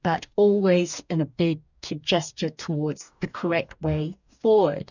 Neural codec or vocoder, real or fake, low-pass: codec, 44.1 kHz, 2.6 kbps, DAC; fake; 7.2 kHz